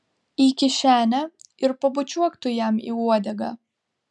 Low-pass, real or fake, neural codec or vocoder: 10.8 kHz; real; none